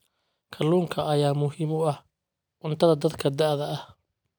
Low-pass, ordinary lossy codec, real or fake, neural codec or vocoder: none; none; real; none